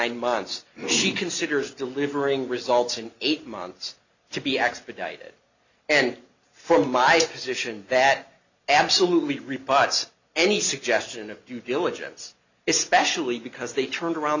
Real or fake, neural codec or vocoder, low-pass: real; none; 7.2 kHz